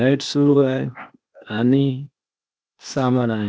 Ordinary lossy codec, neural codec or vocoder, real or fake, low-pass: none; codec, 16 kHz, 0.8 kbps, ZipCodec; fake; none